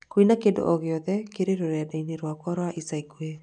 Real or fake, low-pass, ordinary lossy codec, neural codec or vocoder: real; 9.9 kHz; none; none